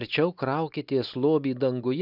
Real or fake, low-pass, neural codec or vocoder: real; 5.4 kHz; none